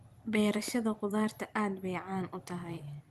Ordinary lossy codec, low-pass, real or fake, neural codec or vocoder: Opus, 24 kbps; 14.4 kHz; fake; vocoder, 44.1 kHz, 128 mel bands every 256 samples, BigVGAN v2